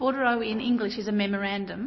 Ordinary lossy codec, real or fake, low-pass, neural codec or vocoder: MP3, 24 kbps; real; 7.2 kHz; none